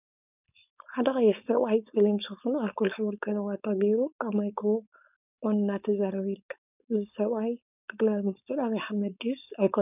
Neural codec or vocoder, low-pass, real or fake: codec, 16 kHz, 4.8 kbps, FACodec; 3.6 kHz; fake